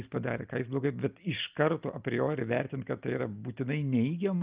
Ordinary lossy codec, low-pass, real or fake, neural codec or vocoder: Opus, 24 kbps; 3.6 kHz; real; none